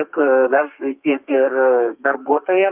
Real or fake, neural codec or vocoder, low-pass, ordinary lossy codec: fake; codec, 32 kHz, 1.9 kbps, SNAC; 3.6 kHz; Opus, 24 kbps